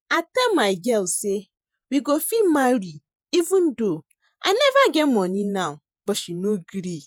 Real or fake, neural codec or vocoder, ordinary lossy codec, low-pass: fake; vocoder, 48 kHz, 128 mel bands, Vocos; none; none